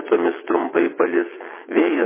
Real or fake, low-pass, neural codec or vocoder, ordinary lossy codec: fake; 3.6 kHz; vocoder, 22.05 kHz, 80 mel bands, WaveNeXt; MP3, 16 kbps